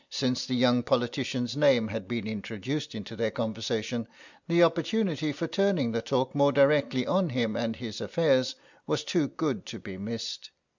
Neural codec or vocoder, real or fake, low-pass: none; real; 7.2 kHz